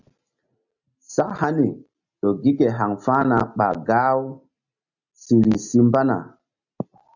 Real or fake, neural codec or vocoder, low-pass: real; none; 7.2 kHz